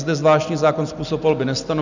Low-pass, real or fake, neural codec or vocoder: 7.2 kHz; real; none